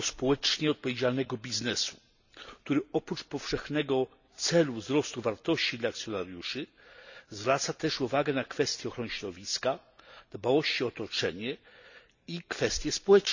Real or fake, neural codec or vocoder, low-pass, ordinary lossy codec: real; none; 7.2 kHz; none